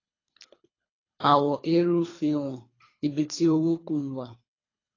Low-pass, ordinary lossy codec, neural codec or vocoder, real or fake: 7.2 kHz; AAC, 32 kbps; codec, 24 kHz, 3 kbps, HILCodec; fake